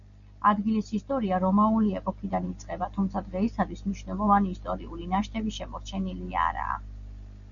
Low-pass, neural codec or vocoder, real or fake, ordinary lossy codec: 7.2 kHz; none; real; MP3, 96 kbps